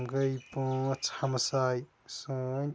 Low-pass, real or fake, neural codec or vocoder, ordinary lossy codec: none; real; none; none